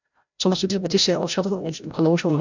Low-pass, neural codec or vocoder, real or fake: 7.2 kHz; codec, 16 kHz, 0.5 kbps, FreqCodec, larger model; fake